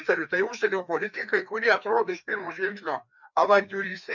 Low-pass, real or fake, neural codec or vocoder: 7.2 kHz; fake; codec, 16 kHz, 2 kbps, FreqCodec, larger model